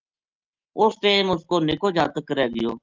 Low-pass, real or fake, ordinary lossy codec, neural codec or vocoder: 7.2 kHz; real; Opus, 24 kbps; none